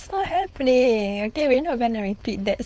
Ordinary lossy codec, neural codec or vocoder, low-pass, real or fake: none; codec, 16 kHz, 4.8 kbps, FACodec; none; fake